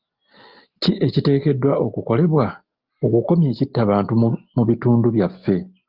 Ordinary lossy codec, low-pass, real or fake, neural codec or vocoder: Opus, 32 kbps; 5.4 kHz; real; none